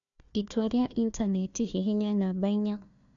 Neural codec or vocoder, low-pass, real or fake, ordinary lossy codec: codec, 16 kHz, 1 kbps, FunCodec, trained on Chinese and English, 50 frames a second; 7.2 kHz; fake; none